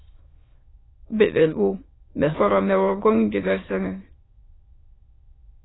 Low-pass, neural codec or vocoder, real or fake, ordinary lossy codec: 7.2 kHz; autoencoder, 22.05 kHz, a latent of 192 numbers a frame, VITS, trained on many speakers; fake; AAC, 16 kbps